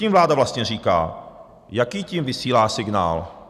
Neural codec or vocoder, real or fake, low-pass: none; real; 14.4 kHz